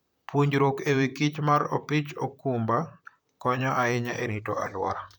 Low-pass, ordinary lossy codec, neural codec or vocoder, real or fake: none; none; vocoder, 44.1 kHz, 128 mel bands, Pupu-Vocoder; fake